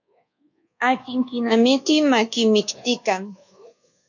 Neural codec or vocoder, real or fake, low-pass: codec, 24 kHz, 1.2 kbps, DualCodec; fake; 7.2 kHz